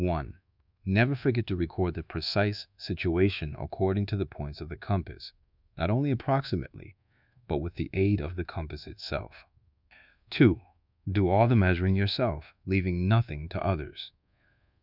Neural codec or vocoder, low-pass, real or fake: codec, 24 kHz, 1.2 kbps, DualCodec; 5.4 kHz; fake